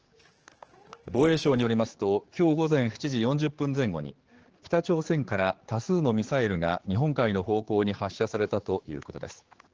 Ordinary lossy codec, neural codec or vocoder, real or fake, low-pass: Opus, 16 kbps; codec, 16 kHz, 4 kbps, X-Codec, HuBERT features, trained on general audio; fake; 7.2 kHz